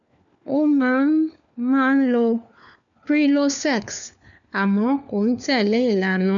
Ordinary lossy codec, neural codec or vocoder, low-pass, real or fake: none; codec, 16 kHz, 4 kbps, FunCodec, trained on LibriTTS, 50 frames a second; 7.2 kHz; fake